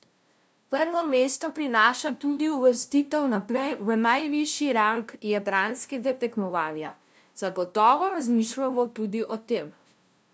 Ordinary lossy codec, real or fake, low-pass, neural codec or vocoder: none; fake; none; codec, 16 kHz, 0.5 kbps, FunCodec, trained on LibriTTS, 25 frames a second